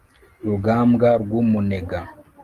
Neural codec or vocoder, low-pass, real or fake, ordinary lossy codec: none; 14.4 kHz; real; Opus, 24 kbps